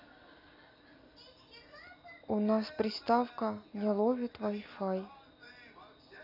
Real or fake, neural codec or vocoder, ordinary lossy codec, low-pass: real; none; none; 5.4 kHz